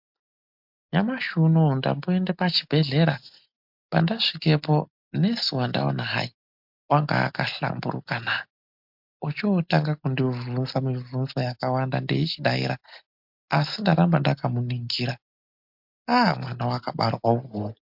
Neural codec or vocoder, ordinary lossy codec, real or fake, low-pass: none; MP3, 48 kbps; real; 5.4 kHz